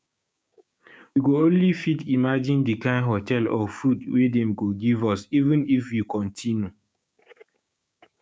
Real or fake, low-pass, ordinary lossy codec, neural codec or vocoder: fake; none; none; codec, 16 kHz, 6 kbps, DAC